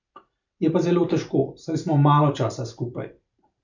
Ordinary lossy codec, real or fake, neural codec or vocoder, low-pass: none; fake; vocoder, 44.1 kHz, 128 mel bands every 512 samples, BigVGAN v2; 7.2 kHz